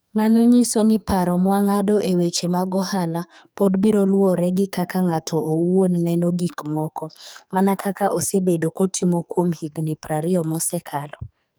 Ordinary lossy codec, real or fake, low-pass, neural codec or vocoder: none; fake; none; codec, 44.1 kHz, 2.6 kbps, SNAC